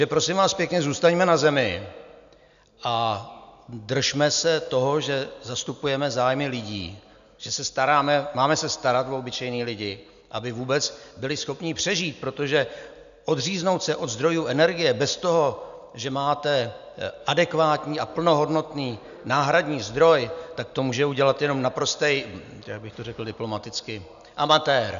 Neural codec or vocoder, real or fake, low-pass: none; real; 7.2 kHz